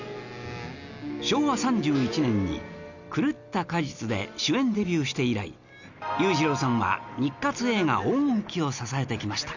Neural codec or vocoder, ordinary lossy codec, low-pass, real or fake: none; none; 7.2 kHz; real